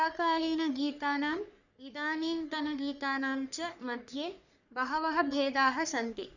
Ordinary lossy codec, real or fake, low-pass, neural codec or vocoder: none; fake; 7.2 kHz; codec, 44.1 kHz, 3.4 kbps, Pupu-Codec